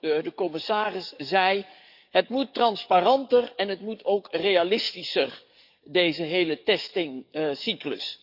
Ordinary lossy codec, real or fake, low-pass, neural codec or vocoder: none; fake; 5.4 kHz; codec, 44.1 kHz, 7.8 kbps, DAC